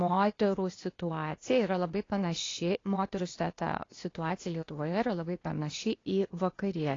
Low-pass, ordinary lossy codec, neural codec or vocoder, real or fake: 7.2 kHz; AAC, 32 kbps; codec, 16 kHz, 0.8 kbps, ZipCodec; fake